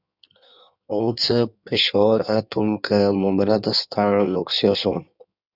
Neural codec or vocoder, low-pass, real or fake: codec, 16 kHz in and 24 kHz out, 1.1 kbps, FireRedTTS-2 codec; 5.4 kHz; fake